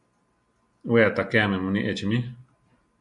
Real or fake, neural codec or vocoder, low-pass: real; none; 10.8 kHz